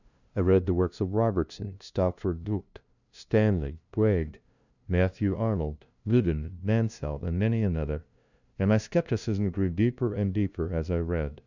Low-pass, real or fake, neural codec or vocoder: 7.2 kHz; fake; codec, 16 kHz, 0.5 kbps, FunCodec, trained on LibriTTS, 25 frames a second